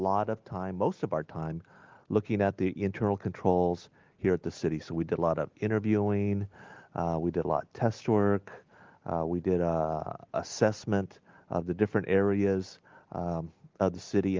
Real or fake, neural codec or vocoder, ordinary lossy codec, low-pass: real; none; Opus, 32 kbps; 7.2 kHz